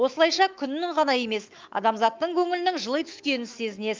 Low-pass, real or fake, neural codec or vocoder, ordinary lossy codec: 7.2 kHz; fake; autoencoder, 48 kHz, 128 numbers a frame, DAC-VAE, trained on Japanese speech; Opus, 24 kbps